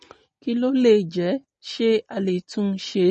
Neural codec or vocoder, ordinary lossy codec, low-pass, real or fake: none; MP3, 32 kbps; 10.8 kHz; real